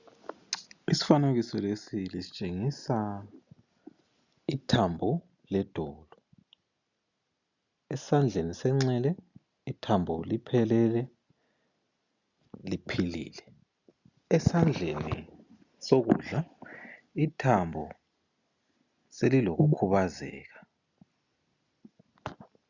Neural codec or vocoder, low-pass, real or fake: none; 7.2 kHz; real